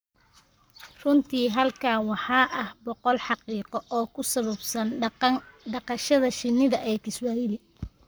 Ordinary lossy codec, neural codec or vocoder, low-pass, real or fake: none; codec, 44.1 kHz, 7.8 kbps, Pupu-Codec; none; fake